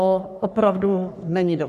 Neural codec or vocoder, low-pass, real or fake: codec, 44.1 kHz, 3.4 kbps, Pupu-Codec; 14.4 kHz; fake